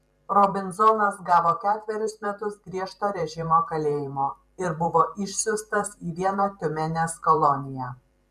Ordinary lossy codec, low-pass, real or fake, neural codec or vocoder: MP3, 96 kbps; 14.4 kHz; fake; vocoder, 44.1 kHz, 128 mel bands every 512 samples, BigVGAN v2